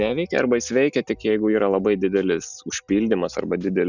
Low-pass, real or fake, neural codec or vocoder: 7.2 kHz; real; none